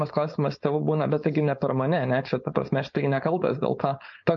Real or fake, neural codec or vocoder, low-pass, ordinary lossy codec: fake; codec, 16 kHz, 4.8 kbps, FACodec; 7.2 kHz; MP3, 48 kbps